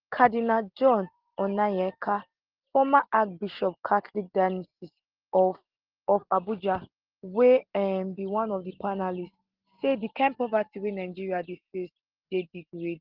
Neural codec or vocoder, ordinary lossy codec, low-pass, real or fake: none; Opus, 16 kbps; 5.4 kHz; real